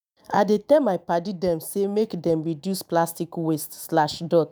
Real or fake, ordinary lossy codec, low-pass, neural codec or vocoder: fake; none; none; autoencoder, 48 kHz, 128 numbers a frame, DAC-VAE, trained on Japanese speech